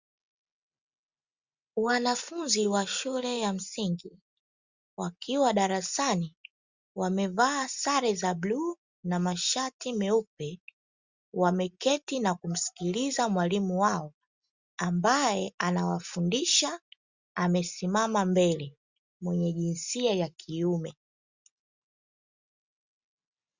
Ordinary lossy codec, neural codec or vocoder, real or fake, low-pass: Opus, 64 kbps; none; real; 7.2 kHz